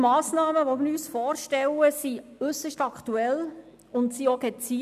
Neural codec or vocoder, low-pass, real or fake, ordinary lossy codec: none; 14.4 kHz; real; none